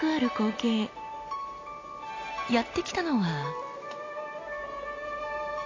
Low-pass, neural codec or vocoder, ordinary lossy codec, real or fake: 7.2 kHz; none; AAC, 32 kbps; real